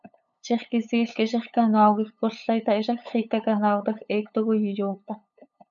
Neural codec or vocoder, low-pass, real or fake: codec, 16 kHz, 8 kbps, FunCodec, trained on LibriTTS, 25 frames a second; 7.2 kHz; fake